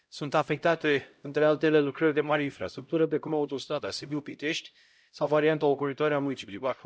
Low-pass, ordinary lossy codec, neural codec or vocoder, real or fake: none; none; codec, 16 kHz, 0.5 kbps, X-Codec, HuBERT features, trained on LibriSpeech; fake